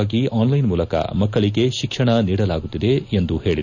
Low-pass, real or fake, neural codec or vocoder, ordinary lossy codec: 7.2 kHz; real; none; none